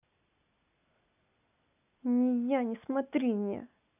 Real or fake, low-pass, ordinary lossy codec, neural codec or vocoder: real; 3.6 kHz; none; none